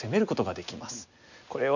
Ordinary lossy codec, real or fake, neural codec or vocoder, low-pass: none; real; none; 7.2 kHz